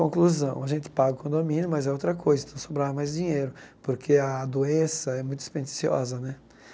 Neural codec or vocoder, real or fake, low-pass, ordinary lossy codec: none; real; none; none